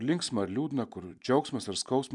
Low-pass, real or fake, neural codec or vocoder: 10.8 kHz; real; none